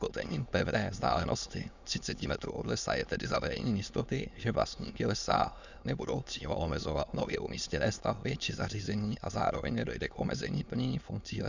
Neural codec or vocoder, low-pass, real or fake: autoencoder, 22.05 kHz, a latent of 192 numbers a frame, VITS, trained on many speakers; 7.2 kHz; fake